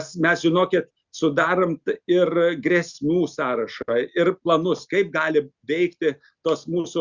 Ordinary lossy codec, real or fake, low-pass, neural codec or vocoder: Opus, 64 kbps; real; 7.2 kHz; none